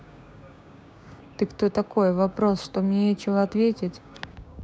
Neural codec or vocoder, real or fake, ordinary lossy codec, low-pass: codec, 16 kHz, 6 kbps, DAC; fake; none; none